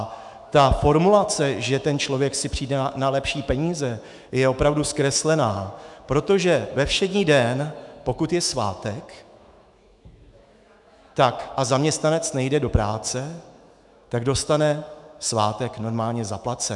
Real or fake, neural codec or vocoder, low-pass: fake; autoencoder, 48 kHz, 128 numbers a frame, DAC-VAE, trained on Japanese speech; 10.8 kHz